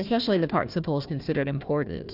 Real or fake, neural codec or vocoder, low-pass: fake; codec, 16 kHz, 1 kbps, FunCodec, trained on Chinese and English, 50 frames a second; 5.4 kHz